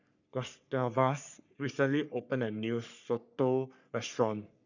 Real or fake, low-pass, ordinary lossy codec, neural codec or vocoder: fake; 7.2 kHz; none; codec, 44.1 kHz, 3.4 kbps, Pupu-Codec